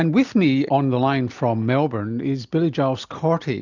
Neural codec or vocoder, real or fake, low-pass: none; real; 7.2 kHz